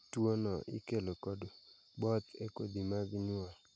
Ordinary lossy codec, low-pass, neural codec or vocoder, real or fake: none; none; none; real